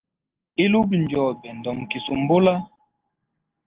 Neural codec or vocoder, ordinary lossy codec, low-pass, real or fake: none; Opus, 16 kbps; 3.6 kHz; real